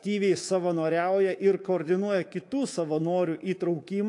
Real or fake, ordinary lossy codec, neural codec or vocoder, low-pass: fake; AAC, 48 kbps; codec, 24 kHz, 3.1 kbps, DualCodec; 10.8 kHz